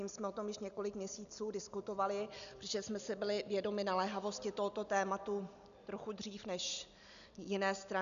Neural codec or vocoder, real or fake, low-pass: none; real; 7.2 kHz